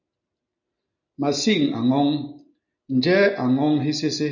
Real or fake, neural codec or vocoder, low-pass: real; none; 7.2 kHz